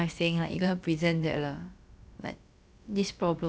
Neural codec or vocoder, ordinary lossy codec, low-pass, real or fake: codec, 16 kHz, about 1 kbps, DyCAST, with the encoder's durations; none; none; fake